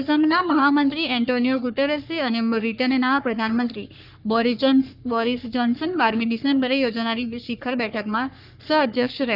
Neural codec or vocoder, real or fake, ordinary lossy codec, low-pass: codec, 44.1 kHz, 3.4 kbps, Pupu-Codec; fake; none; 5.4 kHz